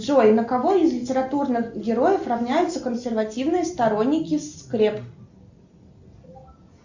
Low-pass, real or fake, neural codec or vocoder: 7.2 kHz; real; none